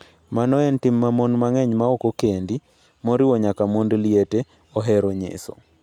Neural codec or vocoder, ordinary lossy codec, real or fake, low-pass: none; none; real; 19.8 kHz